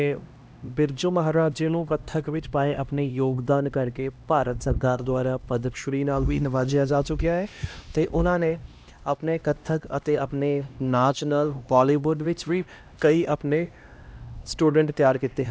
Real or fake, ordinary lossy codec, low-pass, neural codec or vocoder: fake; none; none; codec, 16 kHz, 1 kbps, X-Codec, HuBERT features, trained on LibriSpeech